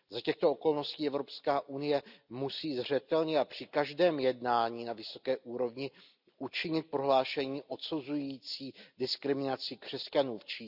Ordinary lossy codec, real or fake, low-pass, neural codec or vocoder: none; real; 5.4 kHz; none